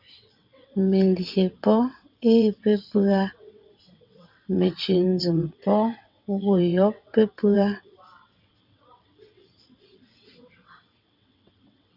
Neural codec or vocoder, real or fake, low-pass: vocoder, 22.05 kHz, 80 mel bands, WaveNeXt; fake; 5.4 kHz